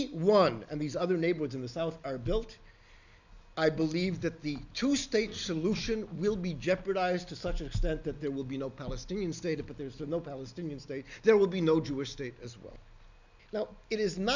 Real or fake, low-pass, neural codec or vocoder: real; 7.2 kHz; none